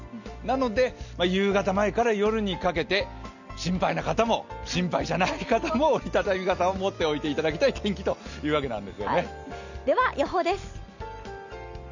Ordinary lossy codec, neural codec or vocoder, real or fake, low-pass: none; none; real; 7.2 kHz